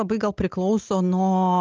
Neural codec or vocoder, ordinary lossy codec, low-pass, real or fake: none; Opus, 24 kbps; 7.2 kHz; real